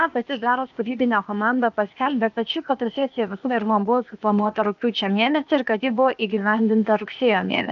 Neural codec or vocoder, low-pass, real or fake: codec, 16 kHz, 0.8 kbps, ZipCodec; 7.2 kHz; fake